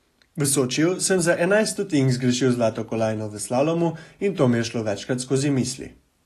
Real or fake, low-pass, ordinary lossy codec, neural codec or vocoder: real; 14.4 kHz; AAC, 48 kbps; none